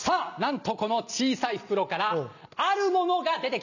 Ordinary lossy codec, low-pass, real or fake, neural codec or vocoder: none; 7.2 kHz; real; none